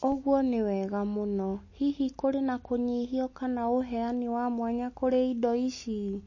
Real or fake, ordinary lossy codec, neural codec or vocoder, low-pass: real; MP3, 32 kbps; none; 7.2 kHz